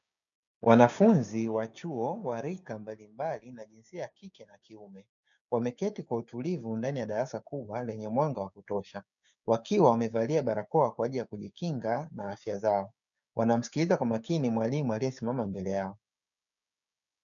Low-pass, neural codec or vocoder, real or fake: 7.2 kHz; codec, 16 kHz, 6 kbps, DAC; fake